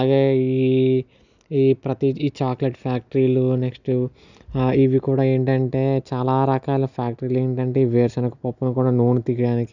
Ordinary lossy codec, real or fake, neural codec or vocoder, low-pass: none; real; none; 7.2 kHz